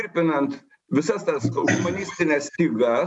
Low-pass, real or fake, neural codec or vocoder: 10.8 kHz; real; none